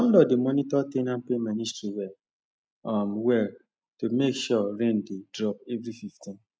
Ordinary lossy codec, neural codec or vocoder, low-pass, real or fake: none; none; none; real